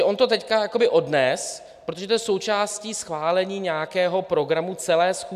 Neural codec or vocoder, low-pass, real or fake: none; 14.4 kHz; real